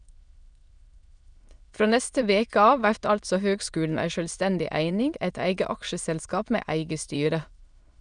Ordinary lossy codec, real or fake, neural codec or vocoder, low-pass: none; fake; autoencoder, 22.05 kHz, a latent of 192 numbers a frame, VITS, trained on many speakers; 9.9 kHz